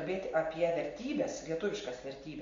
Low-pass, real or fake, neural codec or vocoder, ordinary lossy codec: 7.2 kHz; real; none; MP3, 48 kbps